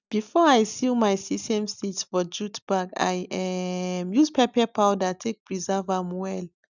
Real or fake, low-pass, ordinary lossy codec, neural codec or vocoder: real; 7.2 kHz; none; none